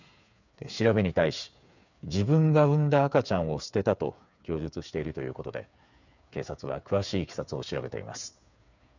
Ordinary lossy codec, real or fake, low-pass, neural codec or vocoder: none; fake; 7.2 kHz; codec, 16 kHz, 8 kbps, FreqCodec, smaller model